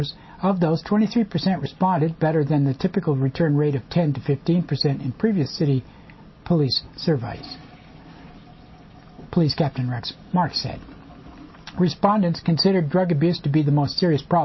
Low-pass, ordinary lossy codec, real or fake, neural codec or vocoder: 7.2 kHz; MP3, 24 kbps; real; none